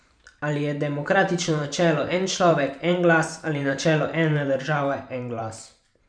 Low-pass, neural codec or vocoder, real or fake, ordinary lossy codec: 9.9 kHz; vocoder, 44.1 kHz, 128 mel bands every 512 samples, BigVGAN v2; fake; none